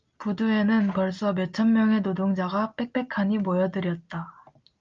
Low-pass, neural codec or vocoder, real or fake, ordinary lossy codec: 7.2 kHz; none; real; Opus, 24 kbps